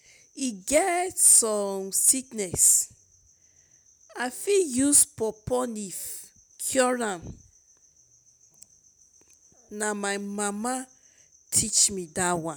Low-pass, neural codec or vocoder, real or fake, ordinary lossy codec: none; none; real; none